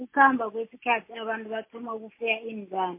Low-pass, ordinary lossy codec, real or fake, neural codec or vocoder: 3.6 kHz; MP3, 24 kbps; real; none